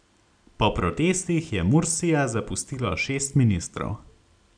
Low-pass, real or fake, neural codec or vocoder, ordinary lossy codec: 9.9 kHz; real; none; none